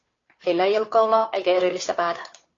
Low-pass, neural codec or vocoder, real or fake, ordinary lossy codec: 7.2 kHz; codec, 16 kHz, 2 kbps, FunCodec, trained on Chinese and English, 25 frames a second; fake; AAC, 32 kbps